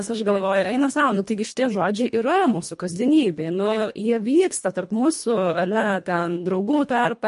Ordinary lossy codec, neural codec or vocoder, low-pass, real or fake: MP3, 48 kbps; codec, 24 kHz, 1.5 kbps, HILCodec; 10.8 kHz; fake